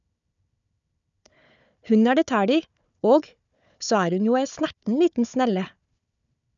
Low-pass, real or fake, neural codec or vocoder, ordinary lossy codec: 7.2 kHz; fake; codec, 16 kHz, 4 kbps, FunCodec, trained on Chinese and English, 50 frames a second; none